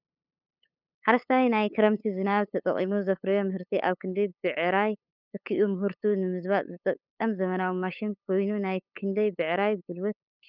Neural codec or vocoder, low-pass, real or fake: codec, 16 kHz, 8 kbps, FunCodec, trained on LibriTTS, 25 frames a second; 5.4 kHz; fake